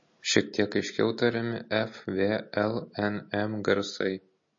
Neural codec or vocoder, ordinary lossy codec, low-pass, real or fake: none; MP3, 32 kbps; 7.2 kHz; real